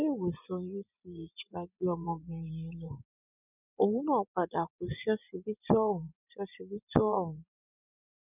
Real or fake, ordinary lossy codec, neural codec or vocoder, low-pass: fake; none; vocoder, 24 kHz, 100 mel bands, Vocos; 3.6 kHz